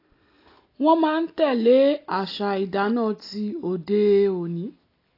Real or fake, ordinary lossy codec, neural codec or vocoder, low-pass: real; AAC, 32 kbps; none; 5.4 kHz